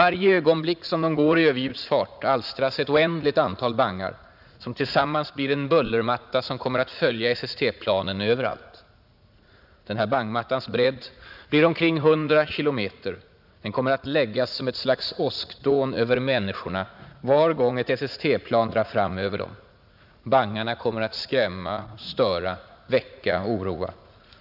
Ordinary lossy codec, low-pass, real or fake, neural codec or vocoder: none; 5.4 kHz; fake; vocoder, 44.1 kHz, 80 mel bands, Vocos